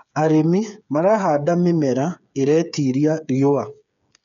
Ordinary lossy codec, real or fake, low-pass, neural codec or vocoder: none; fake; 7.2 kHz; codec, 16 kHz, 8 kbps, FreqCodec, smaller model